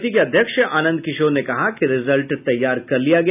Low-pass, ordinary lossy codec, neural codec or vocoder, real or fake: 3.6 kHz; none; none; real